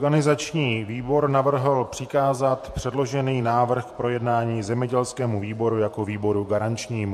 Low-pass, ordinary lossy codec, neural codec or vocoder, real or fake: 14.4 kHz; MP3, 64 kbps; vocoder, 48 kHz, 128 mel bands, Vocos; fake